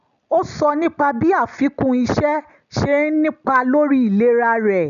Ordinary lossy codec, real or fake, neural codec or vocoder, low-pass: none; real; none; 7.2 kHz